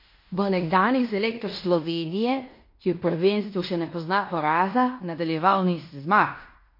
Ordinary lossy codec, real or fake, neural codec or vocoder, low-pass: MP3, 32 kbps; fake; codec, 16 kHz in and 24 kHz out, 0.9 kbps, LongCat-Audio-Codec, fine tuned four codebook decoder; 5.4 kHz